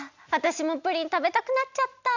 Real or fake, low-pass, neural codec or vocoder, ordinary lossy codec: real; 7.2 kHz; none; none